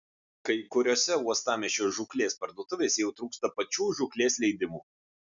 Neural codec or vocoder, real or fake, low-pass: none; real; 7.2 kHz